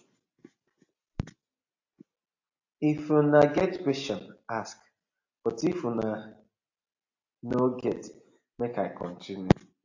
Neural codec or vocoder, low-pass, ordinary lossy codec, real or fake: none; 7.2 kHz; AAC, 48 kbps; real